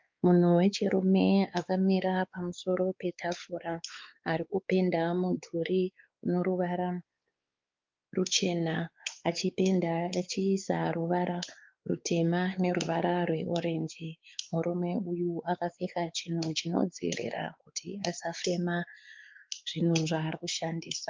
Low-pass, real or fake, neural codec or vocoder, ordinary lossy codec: 7.2 kHz; fake; codec, 16 kHz, 4 kbps, X-Codec, WavLM features, trained on Multilingual LibriSpeech; Opus, 32 kbps